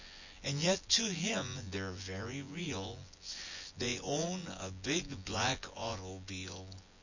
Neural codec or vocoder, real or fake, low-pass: vocoder, 24 kHz, 100 mel bands, Vocos; fake; 7.2 kHz